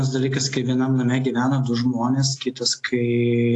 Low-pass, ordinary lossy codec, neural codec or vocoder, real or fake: 10.8 kHz; AAC, 48 kbps; none; real